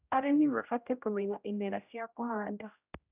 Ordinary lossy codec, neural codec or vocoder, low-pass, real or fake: none; codec, 16 kHz, 0.5 kbps, X-Codec, HuBERT features, trained on general audio; 3.6 kHz; fake